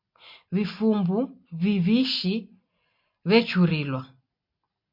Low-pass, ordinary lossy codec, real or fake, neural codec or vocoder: 5.4 kHz; MP3, 32 kbps; real; none